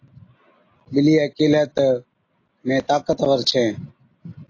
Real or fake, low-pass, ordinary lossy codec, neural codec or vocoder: real; 7.2 kHz; AAC, 32 kbps; none